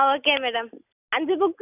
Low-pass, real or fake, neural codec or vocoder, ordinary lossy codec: 3.6 kHz; real; none; none